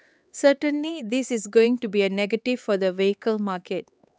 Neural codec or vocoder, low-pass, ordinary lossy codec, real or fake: codec, 16 kHz, 4 kbps, X-Codec, HuBERT features, trained on LibriSpeech; none; none; fake